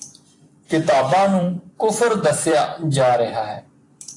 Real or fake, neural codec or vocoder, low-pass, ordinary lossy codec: fake; vocoder, 44.1 kHz, 128 mel bands every 256 samples, BigVGAN v2; 10.8 kHz; AAC, 48 kbps